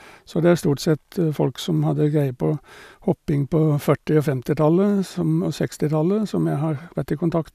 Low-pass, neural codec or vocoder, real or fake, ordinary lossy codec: 14.4 kHz; none; real; none